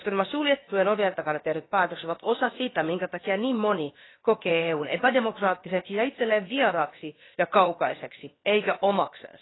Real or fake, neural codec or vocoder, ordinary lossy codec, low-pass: fake; codec, 16 kHz, about 1 kbps, DyCAST, with the encoder's durations; AAC, 16 kbps; 7.2 kHz